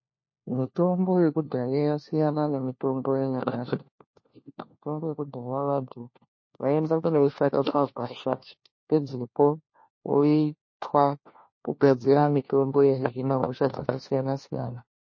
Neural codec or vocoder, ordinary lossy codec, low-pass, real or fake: codec, 16 kHz, 1 kbps, FunCodec, trained on LibriTTS, 50 frames a second; MP3, 32 kbps; 7.2 kHz; fake